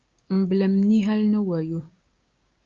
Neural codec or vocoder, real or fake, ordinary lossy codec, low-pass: codec, 16 kHz, 6 kbps, DAC; fake; Opus, 24 kbps; 7.2 kHz